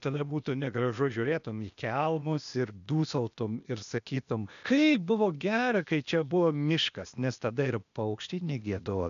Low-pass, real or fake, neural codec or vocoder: 7.2 kHz; fake; codec, 16 kHz, 0.8 kbps, ZipCodec